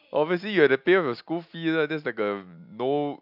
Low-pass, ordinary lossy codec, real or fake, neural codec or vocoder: 5.4 kHz; AAC, 48 kbps; real; none